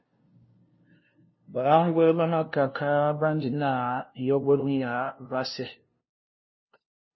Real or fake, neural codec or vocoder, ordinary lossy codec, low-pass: fake; codec, 16 kHz, 0.5 kbps, FunCodec, trained on LibriTTS, 25 frames a second; MP3, 24 kbps; 7.2 kHz